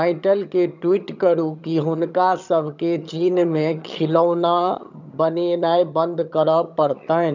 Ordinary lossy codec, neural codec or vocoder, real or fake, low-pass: none; vocoder, 22.05 kHz, 80 mel bands, HiFi-GAN; fake; 7.2 kHz